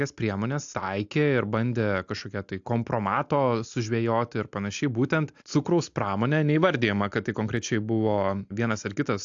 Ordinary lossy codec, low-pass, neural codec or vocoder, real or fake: AAC, 64 kbps; 7.2 kHz; none; real